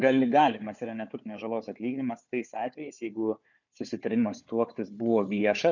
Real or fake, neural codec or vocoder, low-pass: fake; codec, 16 kHz, 4 kbps, FunCodec, trained on Chinese and English, 50 frames a second; 7.2 kHz